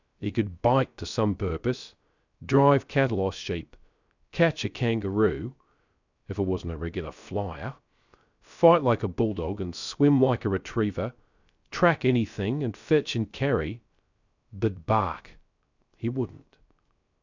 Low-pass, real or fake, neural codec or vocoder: 7.2 kHz; fake; codec, 16 kHz, 0.3 kbps, FocalCodec